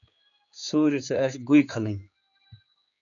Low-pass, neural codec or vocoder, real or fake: 7.2 kHz; codec, 16 kHz, 4 kbps, X-Codec, HuBERT features, trained on general audio; fake